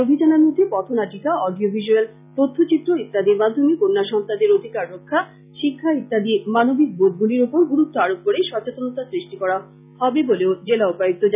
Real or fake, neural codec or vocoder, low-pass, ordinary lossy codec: real; none; 3.6 kHz; none